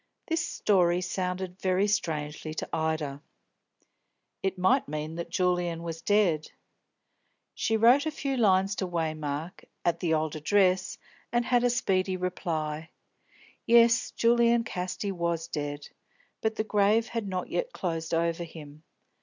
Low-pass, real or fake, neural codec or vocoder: 7.2 kHz; real; none